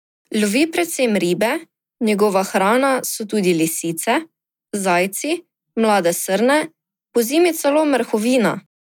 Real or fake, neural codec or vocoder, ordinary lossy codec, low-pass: real; none; none; 19.8 kHz